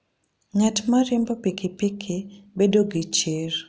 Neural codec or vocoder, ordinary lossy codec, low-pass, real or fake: none; none; none; real